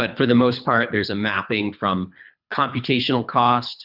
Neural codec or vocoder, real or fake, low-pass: codec, 24 kHz, 3 kbps, HILCodec; fake; 5.4 kHz